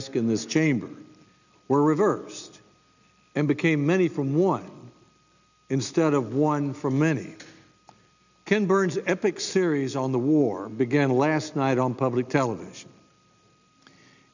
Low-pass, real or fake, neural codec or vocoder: 7.2 kHz; real; none